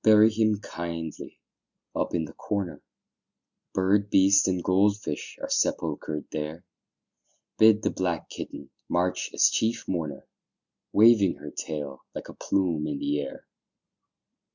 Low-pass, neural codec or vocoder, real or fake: 7.2 kHz; none; real